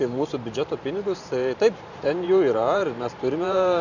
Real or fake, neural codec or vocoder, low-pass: fake; vocoder, 44.1 kHz, 128 mel bands every 512 samples, BigVGAN v2; 7.2 kHz